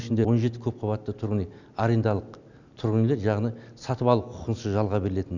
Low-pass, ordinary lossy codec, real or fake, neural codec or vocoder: 7.2 kHz; none; real; none